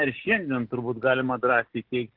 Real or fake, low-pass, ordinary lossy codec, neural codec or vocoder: real; 5.4 kHz; Opus, 16 kbps; none